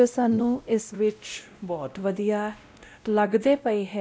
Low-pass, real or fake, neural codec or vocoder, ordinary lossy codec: none; fake; codec, 16 kHz, 0.5 kbps, X-Codec, WavLM features, trained on Multilingual LibriSpeech; none